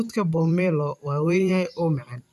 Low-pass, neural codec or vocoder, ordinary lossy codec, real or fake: 14.4 kHz; vocoder, 44.1 kHz, 128 mel bands every 512 samples, BigVGAN v2; none; fake